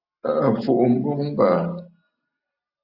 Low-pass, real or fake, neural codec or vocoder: 5.4 kHz; real; none